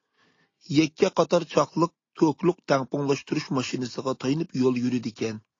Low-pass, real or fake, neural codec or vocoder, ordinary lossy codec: 7.2 kHz; real; none; AAC, 32 kbps